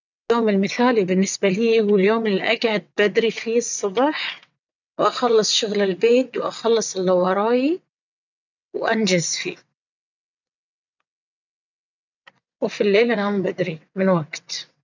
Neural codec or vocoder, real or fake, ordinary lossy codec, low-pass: vocoder, 22.05 kHz, 80 mel bands, WaveNeXt; fake; none; 7.2 kHz